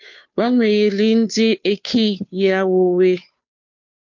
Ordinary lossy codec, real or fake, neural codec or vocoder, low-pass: MP3, 48 kbps; fake; codec, 16 kHz, 2 kbps, FunCodec, trained on Chinese and English, 25 frames a second; 7.2 kHz